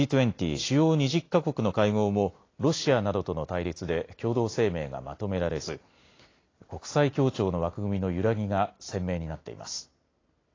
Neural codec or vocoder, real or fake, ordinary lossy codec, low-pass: none; real; AAC, 32 kbps; 7.2 kHz